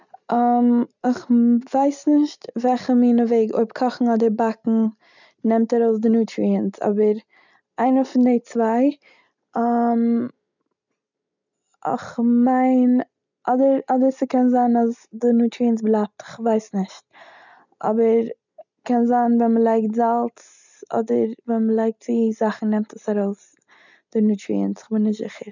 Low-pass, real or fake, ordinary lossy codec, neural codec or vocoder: 7.2 kHz; real; none; none